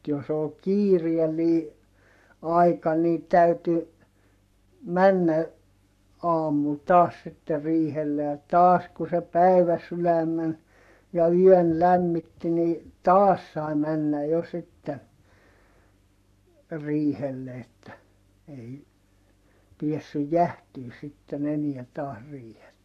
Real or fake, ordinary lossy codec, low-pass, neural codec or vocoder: fake; none; 14.4 kHz; codec, 44.1 kHz, 7.8 kbps, Pupu-Codec